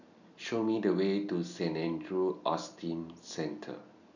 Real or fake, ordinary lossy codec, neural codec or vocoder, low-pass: real; none; none; 7.2 kHz